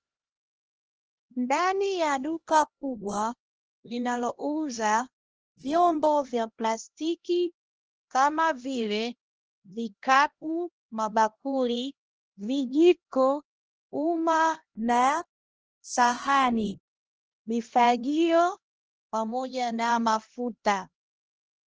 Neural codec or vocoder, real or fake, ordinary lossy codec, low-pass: codec, 16 kHz, 1 kbps, X-Codec, HuBERT features, trained on LibriSpeech; fake; Opus, 16 kbps; 7.2 kHz